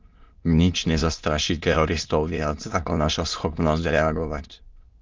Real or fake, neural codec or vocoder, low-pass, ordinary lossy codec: fake; autoencoder, 22.05 kHz, a latent of 192 numbers a frame, VITS, trained on many speakers; 7.2 kHz; Opus, 32 kbps